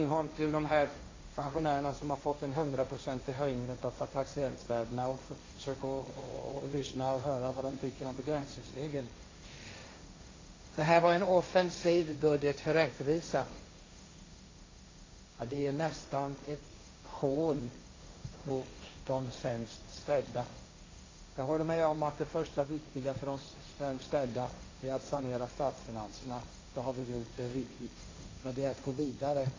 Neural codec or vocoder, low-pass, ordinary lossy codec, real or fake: codec, 16 kHz, 1.1 kbps, Voila-Tokenizer; 7.2 kHz; AAC, 32 kbps; fake